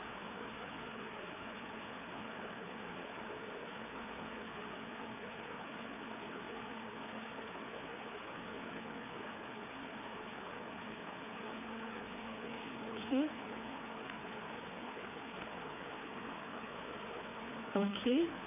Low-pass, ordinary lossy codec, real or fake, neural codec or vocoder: 3.6 kHz; none; fake; codec, 16 kHz, 4 kbps, FreqCodec, larger model